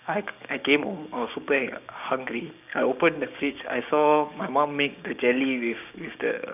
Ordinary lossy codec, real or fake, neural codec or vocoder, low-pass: none; fake; codec, 16 kHz, 6 kbps, DAC; 3.6 kHz